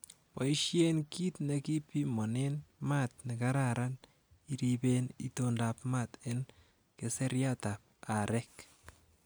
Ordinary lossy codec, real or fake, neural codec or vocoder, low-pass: none; real; none; none